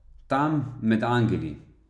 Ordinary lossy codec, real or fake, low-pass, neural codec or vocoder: none; real; 10.8 kHz; none